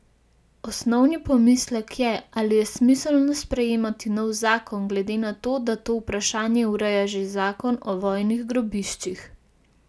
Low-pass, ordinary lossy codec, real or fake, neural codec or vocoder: none; none; real; none